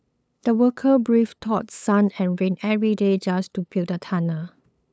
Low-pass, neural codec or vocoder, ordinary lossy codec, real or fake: none; codec, 16 kHz, 8 kbps, FunCodec, trained on LibriTTS, 25 frames a second; none; fake